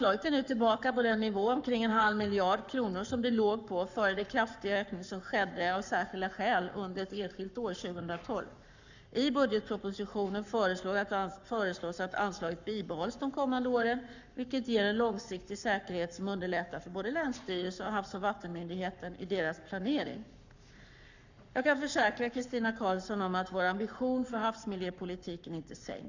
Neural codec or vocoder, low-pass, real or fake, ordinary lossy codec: codec, 44.1 kHz, 7.8 kbps, Pupu-Codec; 7.2 kHz; fake; none